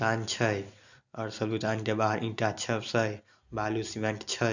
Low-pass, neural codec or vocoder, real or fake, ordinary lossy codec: 7.2 kHz; none; real; none